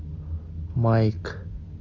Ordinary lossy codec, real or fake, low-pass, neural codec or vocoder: Opus, 64 kbps; real; 7.2 kHz; none